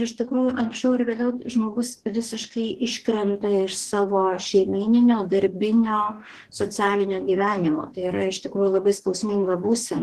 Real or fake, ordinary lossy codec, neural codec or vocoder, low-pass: fake; Opus, 16 kbps; codec, 44.1 kHz, 2.6 kbps, DAC; 14.4 kHz